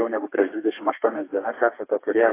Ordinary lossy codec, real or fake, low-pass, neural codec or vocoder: AAC, 24 kbps; fake; 3.6 kHz; codec, 32 kHz, 1.9 kbps, SNAC